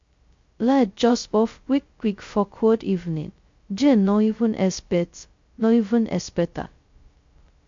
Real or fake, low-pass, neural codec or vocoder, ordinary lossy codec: fake; 7.2 kHz; codec, 16 kHz, 0.2 kbps, FocalCodec; MP3, 48 kbps